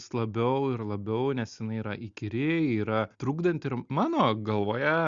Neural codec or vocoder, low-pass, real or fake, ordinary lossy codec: none; 7.2 kHz; real; Opus, 64 kbps